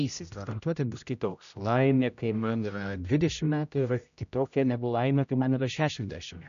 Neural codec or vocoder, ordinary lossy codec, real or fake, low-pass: codec, 16 kHz, 0.5 kbps, X-Codec, HuBERT features, trained on general audio; AAC, 96 kbps; fake; 7.2 kHz